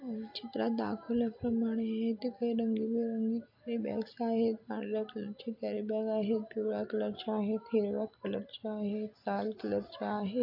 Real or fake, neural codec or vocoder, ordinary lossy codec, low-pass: real; none; AAC, 48 kbps; 5.4 kHz